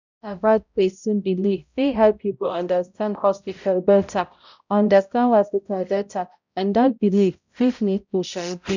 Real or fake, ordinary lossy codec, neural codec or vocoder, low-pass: fake; none; codec, 16 kHz, 0.5 kbps, X-Codec, HuBERT features, trained on balanced general audio; 7.2 kHz